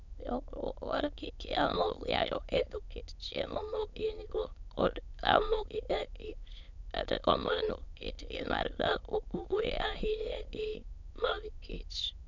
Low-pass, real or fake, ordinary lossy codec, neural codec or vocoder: 7.2 kHz; fake; none; autoencoder, 22.05 kHz, a latent of 192 numbers a frame, VITS, trained on many speakers